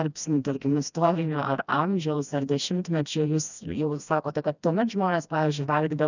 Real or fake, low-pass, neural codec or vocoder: fake; 7.2 kHz; codec, 16 kHz, 1 kbps, FreqCodec, smaller model